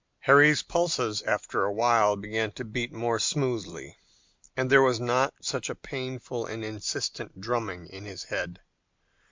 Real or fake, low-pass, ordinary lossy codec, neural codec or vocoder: real; 7.2 kHz; MP3, 64 kbps; none